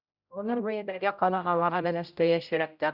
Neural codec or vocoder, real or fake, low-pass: codec, 16 kHz, 0.5 kbps, X-Codec, HuBERT features, trained on general audio; fake; 5.4 kHz